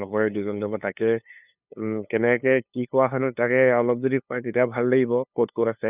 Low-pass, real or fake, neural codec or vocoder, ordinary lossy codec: 3.6 kHz; fake; codec, 16 kHz, 2 kbps, FunCodec, trained on LibriTTS, 25 frames a second; none